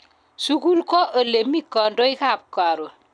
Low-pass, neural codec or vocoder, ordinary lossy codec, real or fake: 9.9 kHz; none; none; real